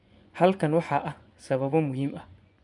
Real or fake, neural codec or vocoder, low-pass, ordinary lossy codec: fake; vocoder, 24 kHz, 100 mel bands, Vocos; 10.8 kHz; none